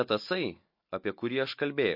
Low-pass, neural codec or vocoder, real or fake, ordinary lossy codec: 5.4 kHz; none; real; MP3, 32 kbps